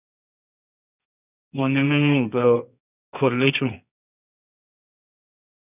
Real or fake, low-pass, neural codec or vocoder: fake; 3.6 kHz; codec, 24 kHz, 0.9 kbps, WavTokenizer, medium music audio release